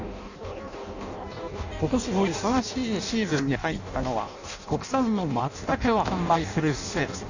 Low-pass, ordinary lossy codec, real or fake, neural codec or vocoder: 7.2 kHz; none; fake; codec, 16 kHz in and 24 kHz out, 0.6 kbps, FireRedTTS-2 codec